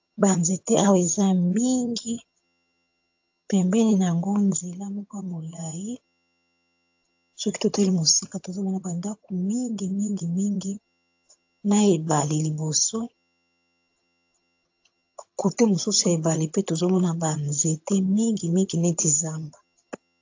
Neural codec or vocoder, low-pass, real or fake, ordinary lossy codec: vocoder, 22.05 kHz, 80 mel bands, HiFi-GAN; 7.2 kHz; fake; AAC, 48 kbps